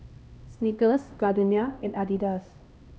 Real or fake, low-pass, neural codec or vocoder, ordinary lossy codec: fake; none; codec, 16 kHz, 1 kbps, X-Codec, HuBERT features, trained on LibriSpeech; none